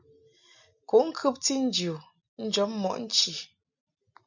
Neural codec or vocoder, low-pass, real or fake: none; 7.2 kHz; real